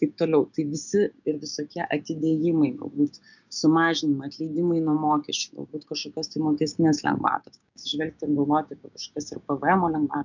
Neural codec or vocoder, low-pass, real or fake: codec, 16 kHz, 6 kbps, DAC; 7.2 kHz; fake